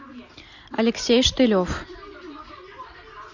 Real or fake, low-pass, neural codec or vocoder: real; 7.2 kHz; none